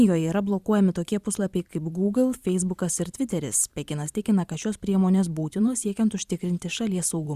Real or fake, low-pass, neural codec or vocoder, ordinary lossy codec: fake; 14.4 kHz; vocoder, 44.1 kHz, 128 mel bands every 512 samples, BigVGAN v2; Opus, 64 kbps